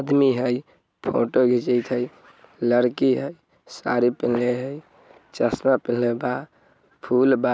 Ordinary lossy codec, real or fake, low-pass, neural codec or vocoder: none; real; none; none